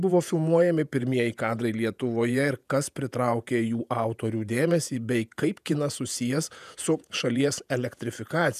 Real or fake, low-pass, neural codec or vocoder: fake; 14.4 kHz; vocoder, 48 kHz, 128 mel bands, Vocos